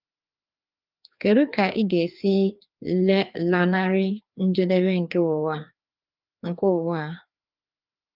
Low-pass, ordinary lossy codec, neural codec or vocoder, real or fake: 5.4 kHz; Opus, 32 kbps; codec, 16 kHz, 2 kbps, FreqCodec, larger model; fake